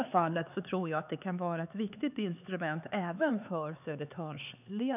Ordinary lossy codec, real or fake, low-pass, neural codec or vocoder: none; fake; 3.6 kHz; codec, 16 kHz, 4 kbps, X-Codec, HuBERT features, trained on LibriSpeech